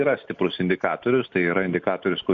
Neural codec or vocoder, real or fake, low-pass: none; real; 7.2 kHz